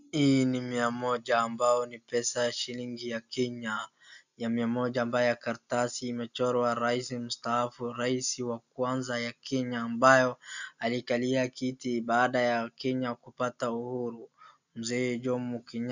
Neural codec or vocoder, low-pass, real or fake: none; 7.2 kHz; real